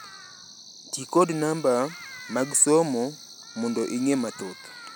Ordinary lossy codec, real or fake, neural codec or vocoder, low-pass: none; real; none; none